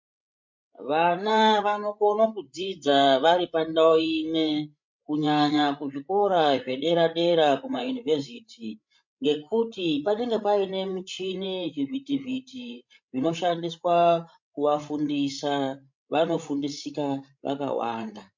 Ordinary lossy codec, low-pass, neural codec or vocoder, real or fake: MP3, 48 kbps; 7.2 kHz; codec, 16 kHz, 16 kbps, FreqCodec, larger model; fake